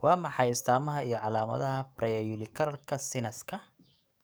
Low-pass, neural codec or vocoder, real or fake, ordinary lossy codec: none; codec, 44.1 kHz, 7.8 kbps, DAC; fake; none